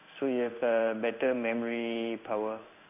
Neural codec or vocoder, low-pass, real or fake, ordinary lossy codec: codec, 16 kHz in and 24 kHz out, 1 kbps, XY-Tokenizer; 3.6 kHz; fake; none